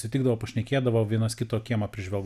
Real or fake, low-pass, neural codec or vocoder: real; 14.4 kHz; none